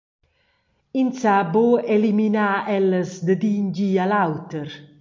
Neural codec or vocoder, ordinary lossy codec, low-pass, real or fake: none; MP3, 48 kbps; 7.2 kHz; real